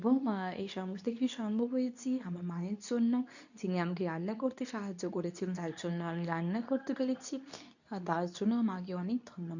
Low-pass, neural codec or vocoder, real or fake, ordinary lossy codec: 7.2 kHz; codec, 24 kHz, 0.9 kbps, WavTokenizer, medium speech release version 2; fake; none